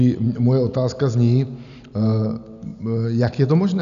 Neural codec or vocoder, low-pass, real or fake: none; 7.2 kHz; real